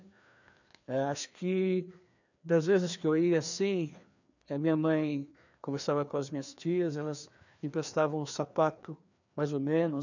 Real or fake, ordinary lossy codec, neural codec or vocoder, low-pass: fake; none; codec, 16 kHz, 2 kbps, FreqCodec, larger model; 7.2 kHz